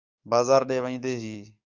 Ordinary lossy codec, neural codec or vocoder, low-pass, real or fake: Opus, 64 kbps; codec, 44.1 kHz, 7.8 kbps, DAC; 7.2 kHz; fake